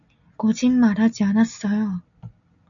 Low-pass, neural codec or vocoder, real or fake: 7.2 kHz; none; real